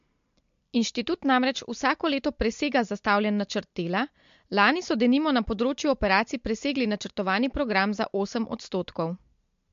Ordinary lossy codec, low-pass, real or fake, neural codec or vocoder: MP3, 48 kbps; 7.2 kHz; real; none